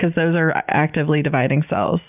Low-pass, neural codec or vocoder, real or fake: 3.6 kHz; none; real